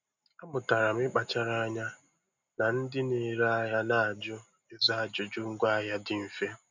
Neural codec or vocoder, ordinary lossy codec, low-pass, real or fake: none; none; 7.2 kHz; real